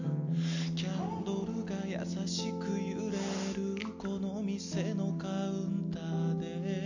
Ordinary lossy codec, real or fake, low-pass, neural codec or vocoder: none; real; 7.2 kHz; none